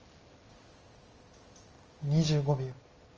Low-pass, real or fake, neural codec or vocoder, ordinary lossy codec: 7.2 kHz; real; none; Opus, 24 kbps